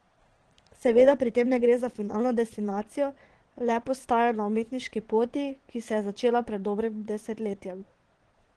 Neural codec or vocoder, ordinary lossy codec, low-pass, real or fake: vocoder, 22.05 kHz, 80 mel bands, Vocos; Opus, 16 kbps; 9.9 kHz; fake